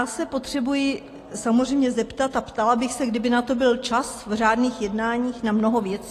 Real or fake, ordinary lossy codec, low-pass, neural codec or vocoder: real; AAC, 48 kbps; 14.4 kHz; none